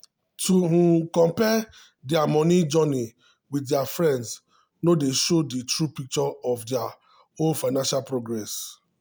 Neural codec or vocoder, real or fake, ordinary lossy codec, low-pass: none; real; none; none